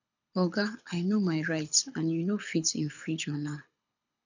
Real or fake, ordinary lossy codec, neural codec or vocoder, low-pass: fake; none; codec, 24 kHz, 6 kbps, HILCodec; 7.2 kHz